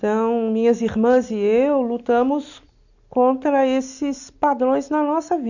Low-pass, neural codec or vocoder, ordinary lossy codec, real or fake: 7.2 kHz; none; none; real